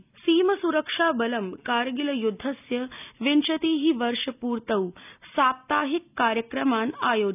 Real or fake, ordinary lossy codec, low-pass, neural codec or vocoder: real; none; 3.6 kHz; none